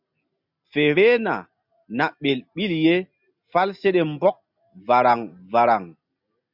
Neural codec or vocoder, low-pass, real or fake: none; 5.4 kHz; real